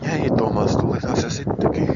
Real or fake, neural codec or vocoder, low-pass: real; none; 7.2 kHz